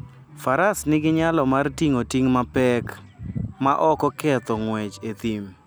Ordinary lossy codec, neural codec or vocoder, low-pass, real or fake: none; none; none; real